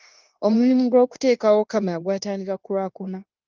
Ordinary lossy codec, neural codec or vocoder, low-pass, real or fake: Opus, 32 kbps; codec, 16 kHz, 0.9 kbps, LongCat-Audio-Codec; 7.2 kHz; fake